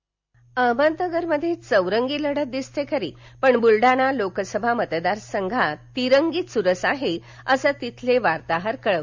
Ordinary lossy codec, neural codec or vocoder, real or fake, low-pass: MP3, 64 kbps; none; real; 7.2 kHz